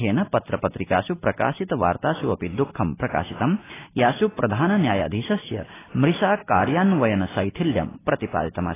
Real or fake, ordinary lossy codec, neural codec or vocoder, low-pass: real; AAC, 16 kbps; none; 3.6 kHz